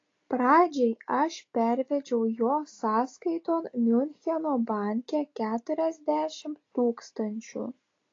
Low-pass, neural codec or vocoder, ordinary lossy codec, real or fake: 7.2 kHz; none; AAC, 32 kbps; real